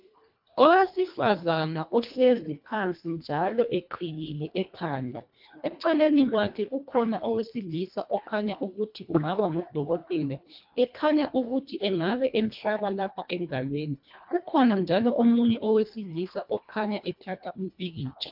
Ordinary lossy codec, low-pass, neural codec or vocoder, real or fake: MP3, 48 kbps; 5.4 kHz; codec, 24 kHz, 1.5 kbps, HILCodec; fake